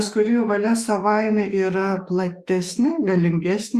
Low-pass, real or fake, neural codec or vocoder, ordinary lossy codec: 14.4 kHz; fake; autoencoder, 48 kHz, 32 numbers a frame, DAC-VAE, trained on Japanese speech; Opus, 64 kbps